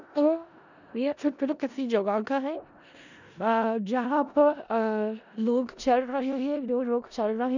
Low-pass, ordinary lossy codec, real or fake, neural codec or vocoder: 7.2 kHz; none; fake; codec, 16 kHz in and 24 kHz out, 0.4 kbps, LongCat-Audio-Codec, four codebook decoder